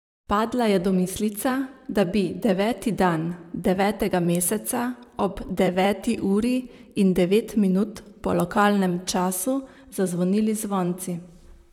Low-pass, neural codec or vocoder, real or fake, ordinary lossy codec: 19.8 kHz; vocoder, 44.1 kHz, 128 mel bands, Pupu-Vocoder; fake; none